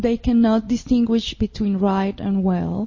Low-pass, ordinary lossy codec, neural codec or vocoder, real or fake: 7.2 kHz; MP3, 32 kbps; none; real